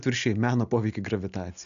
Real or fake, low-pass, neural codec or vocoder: real; 7.2 kHz; none